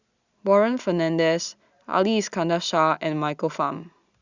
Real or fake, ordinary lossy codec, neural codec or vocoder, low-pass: real; Opus, 64 kbps; none; 7.2 kHz